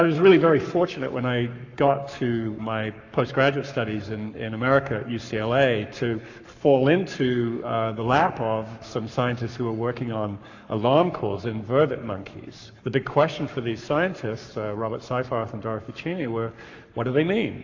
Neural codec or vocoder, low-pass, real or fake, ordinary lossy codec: codec, 44.1 kHz, 7.8 kbps, Pupu-Codec; 7.2 kHz; fake; AAC, 48 kbps